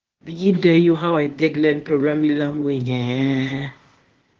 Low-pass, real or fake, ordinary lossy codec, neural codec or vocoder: 7.2 kHz; fake; Opus, 16 kbps; codec, 16 kHz, 0.8 kbps, ZipCodec